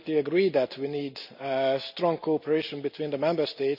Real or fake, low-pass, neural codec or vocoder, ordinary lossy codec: real; 5.4 kHz; none; none